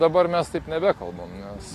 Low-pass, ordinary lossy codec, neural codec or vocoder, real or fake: 14.4 kHz; AAC, 48 kbps; none; real